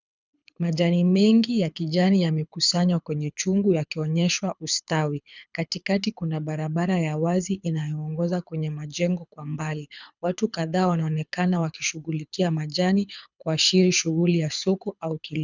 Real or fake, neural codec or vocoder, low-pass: fake; codec, 24 kHz, 6 kbps, HILCodec; 7.2 kHz